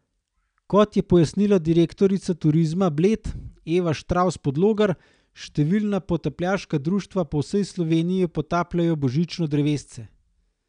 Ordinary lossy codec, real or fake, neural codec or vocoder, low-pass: none; real; none; 9.9 kHz